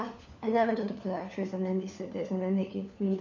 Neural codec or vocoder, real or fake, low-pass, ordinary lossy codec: codec, 16 kHz, 4 kbps, FunCodec, trained on LibriTTS, 50 frames a second; fake; 7.2 kHz; none